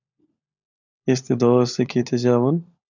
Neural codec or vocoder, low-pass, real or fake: codec, 16 kHz, 16 kbps, FunCodec, trained on LibriTTS, 50 frames a second; 7.2 kHz; fake